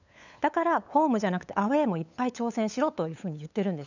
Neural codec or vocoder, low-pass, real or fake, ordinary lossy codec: codec, 16 kHz, 8 kbps, FunCodec, trained on LibriTTS, 25 frames a second; 7.2 kHz; fake; none